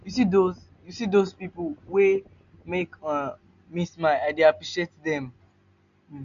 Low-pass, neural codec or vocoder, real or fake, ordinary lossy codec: 7.2 kHz; none; real; none